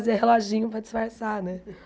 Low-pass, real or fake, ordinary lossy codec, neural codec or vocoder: none; real; none; none